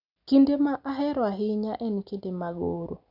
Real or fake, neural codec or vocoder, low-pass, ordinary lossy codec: real; none; 5.4 kHz; none